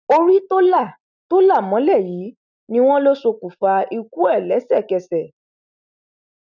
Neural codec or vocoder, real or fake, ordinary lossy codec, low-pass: none; real; none; 7.2 kHz